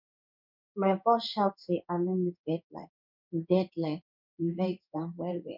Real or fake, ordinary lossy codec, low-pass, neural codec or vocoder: fake; none; 5.4 kHz; codec, 16 kHz in and 24 kHz out, 1 kbps, XY-Tokenizer